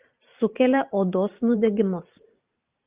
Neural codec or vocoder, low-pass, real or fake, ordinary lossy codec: vocoder, 44.1 kHz, 128 mel bands every 512 samples, BigVGAN v2; 3.6 kHz; fake; Opus, 24 kbps